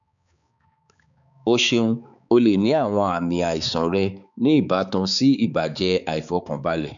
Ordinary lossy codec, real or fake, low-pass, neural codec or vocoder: none; fake; 7.2 kHz; codec, 16 kHz, 4 kbps, X-Codec, HuBERT features, trained on balanced general audio